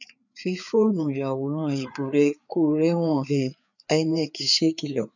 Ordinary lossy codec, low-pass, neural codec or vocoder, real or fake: none; 7.2 kHz; codec, 16 kHz, 4 kbps, FreqCodec, larger model; fake